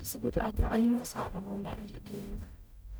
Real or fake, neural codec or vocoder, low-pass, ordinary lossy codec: fake; codec, 44.1 kHz, 0.9 kbps, DAC; none; none